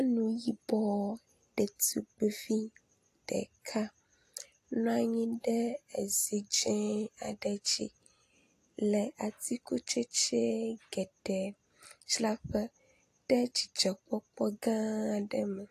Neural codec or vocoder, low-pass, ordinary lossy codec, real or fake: none; 14.4 kHz; AAC, 48 kbps; real